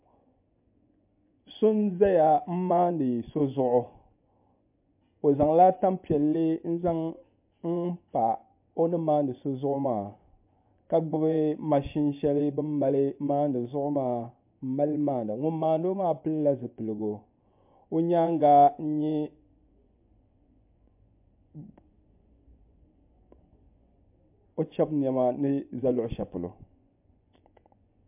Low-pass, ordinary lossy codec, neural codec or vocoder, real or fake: 3.6 kHz; MP3, 32 kbps; vocoder, 24 kHz, 100 mel bands, Vocos; fake